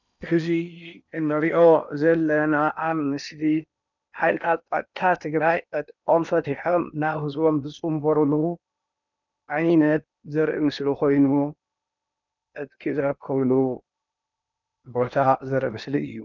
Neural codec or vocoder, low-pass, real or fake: codec, 16 kHz in and 24 kHz out, 0.8 kbps, FocalCodec, streaming, 65536 codes; 7.2 kHz; fake